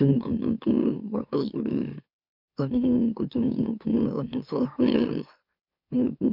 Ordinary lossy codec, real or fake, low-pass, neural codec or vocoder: MP3, 48 kbps; fake; 5.4 kHz; autoencoder, 44.1 kHz, a latent of 192 numbers a frame, MeloTTS